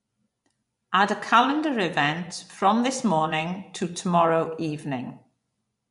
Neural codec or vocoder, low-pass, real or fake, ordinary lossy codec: vocoder, 24 kHz, 100 mel bands, Vocos; 10.8 kHz; fake; MP3, 64 kbps